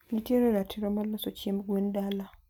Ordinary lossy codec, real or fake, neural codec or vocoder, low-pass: none; real; none; 19.8 kHz